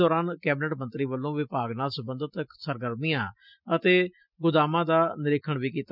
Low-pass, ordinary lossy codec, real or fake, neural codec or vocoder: 5.4 kHz; none; real; none